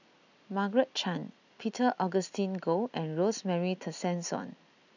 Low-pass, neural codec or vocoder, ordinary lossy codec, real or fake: 7.2 kHz; none; none; real